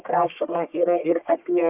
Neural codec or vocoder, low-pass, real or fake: codec, 44.1 kHz, 1.7 kbps, Pupu-Codec; 3.6 kHz; fake